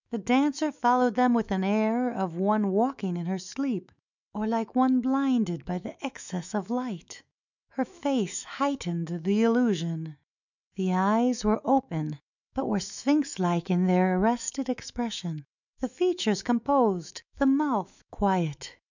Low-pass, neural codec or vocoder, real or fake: 7.2 kHz; autoencoder, 48 kHz, 128 numbers a frame, DAC-VAE, trained on Japanese speech; fake